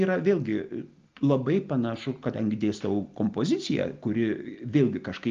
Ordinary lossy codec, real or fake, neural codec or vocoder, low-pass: Opus, 32 kbps; real; none; 7.2 kHz